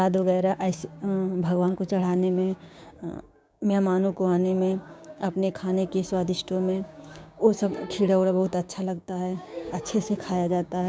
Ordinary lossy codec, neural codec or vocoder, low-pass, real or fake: none; codec, 16 kHz, 6 kbps, DAC; none; fake